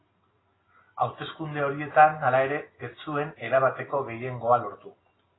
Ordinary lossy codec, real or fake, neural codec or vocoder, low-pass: AAC, 16 kbps; real; none; 7.2 kHz